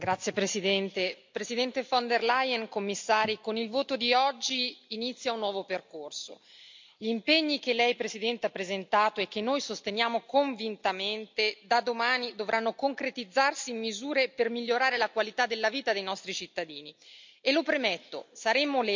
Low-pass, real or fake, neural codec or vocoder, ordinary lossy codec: 7.2 kHz; real; none; MP3, 64 kbps